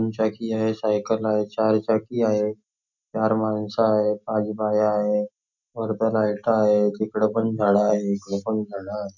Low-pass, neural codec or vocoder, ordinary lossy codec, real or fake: 7.2 kHz; none; none; real